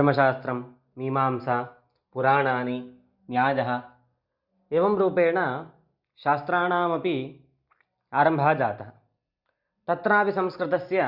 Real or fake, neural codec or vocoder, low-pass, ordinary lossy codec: real; none; 5.4 kHz; Opus, 64 kbps